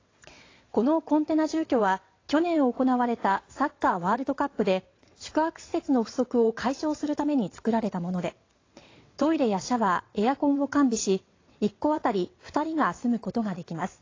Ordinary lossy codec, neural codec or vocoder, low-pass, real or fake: AAC, 32 kbps; vocoder, 22.05 kHz, 80 mel bands, WaveNeXt; 7.2 kHz; fake